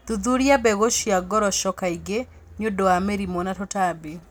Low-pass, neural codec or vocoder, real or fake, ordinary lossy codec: none; none; real; none